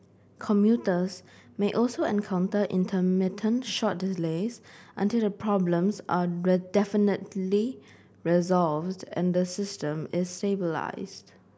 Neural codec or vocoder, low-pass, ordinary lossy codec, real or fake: none; none; none; real